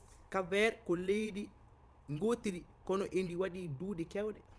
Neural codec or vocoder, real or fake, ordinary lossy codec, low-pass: vocoder, 22.05 kHz, 80 mel bands, Vocos; fake; none; none